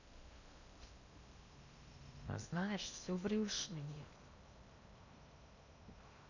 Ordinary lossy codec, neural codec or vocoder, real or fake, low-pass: none; codec, 16 kHz in and 24 kHz out, 0.8 kbps, FocalCodec, streaming, 65536 codes; fake; 7.2 kHz